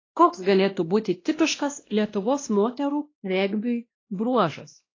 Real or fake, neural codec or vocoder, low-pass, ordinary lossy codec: fake; codec, 16 kHz, 1 kbps, X-Codec, WavLM features, trained on Multilingual LibriSpeech; 7.2 kHz; AAC, 32 kbps